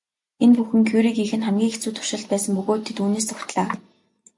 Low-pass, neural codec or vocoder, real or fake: 10.8 kHz; none; real